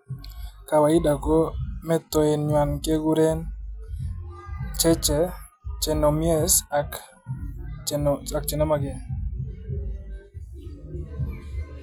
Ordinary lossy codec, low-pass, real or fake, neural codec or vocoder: none; none; real; none